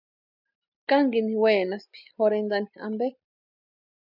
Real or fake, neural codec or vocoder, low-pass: real; none; 5.4 kHz